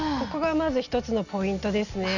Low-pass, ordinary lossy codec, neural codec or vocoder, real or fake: 7.2 kHz; none; none; real